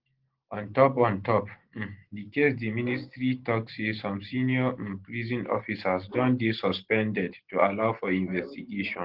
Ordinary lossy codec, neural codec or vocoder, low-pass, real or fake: Opus, 16 kbps; none; 5.4 kHz; real